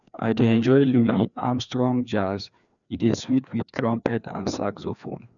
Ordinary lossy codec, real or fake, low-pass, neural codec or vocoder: none; fake; 7.2 kHz; codec, 16 kHz, 2 kbps, FreqCodec, larger model